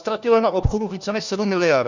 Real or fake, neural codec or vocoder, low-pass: fake; codec, 16 kHz, 1 kbps, FunCodec, trained on LibriTTS, 50 frames a second; 7.2 kHz